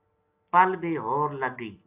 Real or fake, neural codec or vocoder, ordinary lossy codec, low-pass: real; none; AAC, 32 kbps; 3.6 kHz